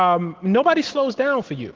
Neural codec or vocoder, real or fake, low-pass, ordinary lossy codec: none; real; 7.2 kHz; Opus, 16 kbps